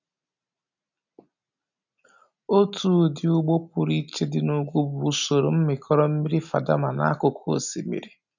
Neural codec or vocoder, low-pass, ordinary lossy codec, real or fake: none; 7.2 kHz; none; real